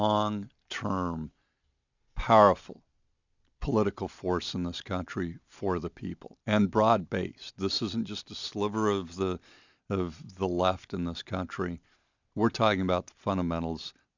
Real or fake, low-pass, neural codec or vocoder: real; 7.2 kHz; none